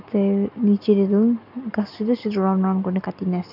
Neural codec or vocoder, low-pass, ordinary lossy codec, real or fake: none; 5.4 kHz; none; real